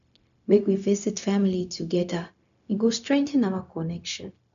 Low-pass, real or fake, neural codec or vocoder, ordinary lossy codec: 7.2 kHz; fake; codec, 16 kHz, 0.4 kbps, LongCat-Audio-Codec; none